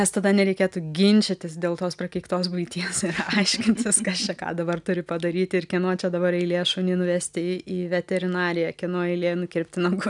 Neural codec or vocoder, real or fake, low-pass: none; real; 10.8 kHz